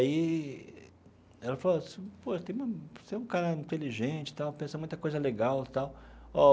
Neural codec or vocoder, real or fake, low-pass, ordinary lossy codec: none; real; none; none